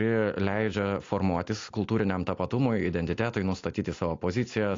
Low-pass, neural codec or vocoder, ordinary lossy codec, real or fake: 7.2 kHz; none; AAC, 48 kbps; real